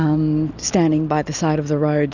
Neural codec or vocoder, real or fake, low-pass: none; real; 7.2 kHz